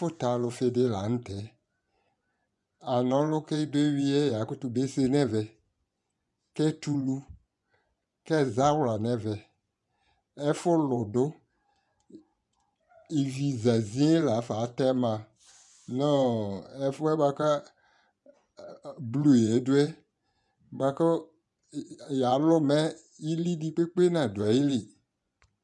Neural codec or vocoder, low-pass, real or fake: vocoder, 24 kHz, 100 mel bands, Vocos; 10.8 kHz; fake